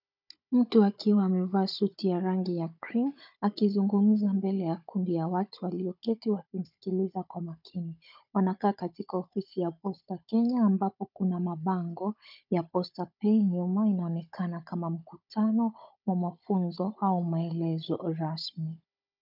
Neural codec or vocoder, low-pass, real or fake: codec, 16 kHz, 16 kbps, FunCodec, trained on Chinese and English, 50 frames a second; 5.4 kHz; fake